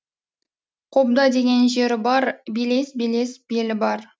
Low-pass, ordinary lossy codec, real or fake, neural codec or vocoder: none; none; real; none